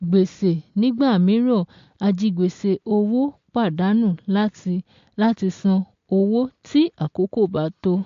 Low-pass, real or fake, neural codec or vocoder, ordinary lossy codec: 7.2 kHz; real; none; MP3, 48 kbps